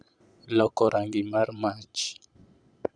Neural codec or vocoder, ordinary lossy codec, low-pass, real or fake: vocoder, 22.05 kHz, 80 mel bands, Vocos; Opus, 64 kbps; 9.9 kHz; fake